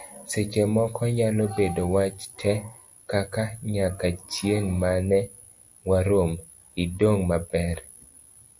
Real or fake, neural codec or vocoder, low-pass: real; none; 10.8 kHz